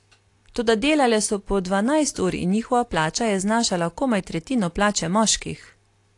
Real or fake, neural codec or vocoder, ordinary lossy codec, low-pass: real; none; AAC, 48 kbps; 10.8 kHz